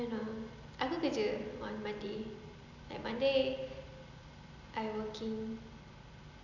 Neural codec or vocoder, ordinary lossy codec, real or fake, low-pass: none; none; real; 7.2 kHz